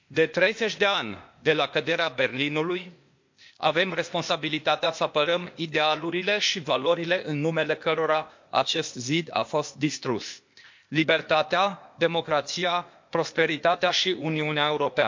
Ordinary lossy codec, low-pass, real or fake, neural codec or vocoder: MP3, 48 kbps; 7.2 kHz; fake; codec, 16 kHz, 0.8 kbps, ZipCodec